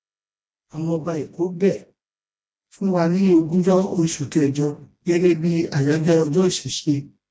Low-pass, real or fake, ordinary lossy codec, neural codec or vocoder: none; fake; none; codec, 16 kHz, 1 kbps, FreqCodec, smaller model